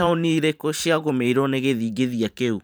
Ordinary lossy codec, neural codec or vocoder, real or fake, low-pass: none; vocoder, 44.1 kHz, 128 mel bands every 512 samples, BigVGAN v2; fake; none